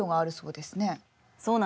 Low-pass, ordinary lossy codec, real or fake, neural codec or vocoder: none; none; real; none